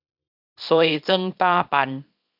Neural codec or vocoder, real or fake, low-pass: codec, 24 kHz, 0.9 kbps, WavTokenizer, small release; fake; 5.4 kHz